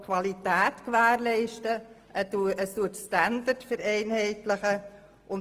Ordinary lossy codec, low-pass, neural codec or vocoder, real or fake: Opus, 24 kbps; 14.4 kHz; none; real